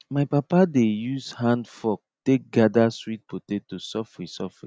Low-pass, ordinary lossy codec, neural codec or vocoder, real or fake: none; none; none; real